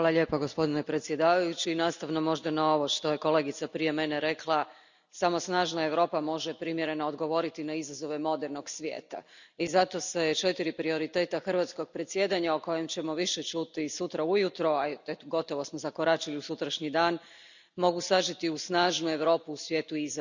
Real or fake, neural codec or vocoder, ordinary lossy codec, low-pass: real; none; none; 7.2 kHz